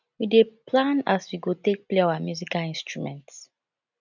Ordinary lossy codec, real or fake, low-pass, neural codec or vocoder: none; real; 7.2 kHz; none